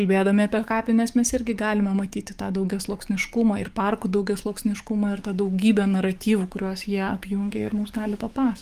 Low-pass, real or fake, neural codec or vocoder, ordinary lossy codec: 14.4 kHz; fake; codec, 44.1 kHz, 7.8 kbps, DAC; Opus, 32 kbps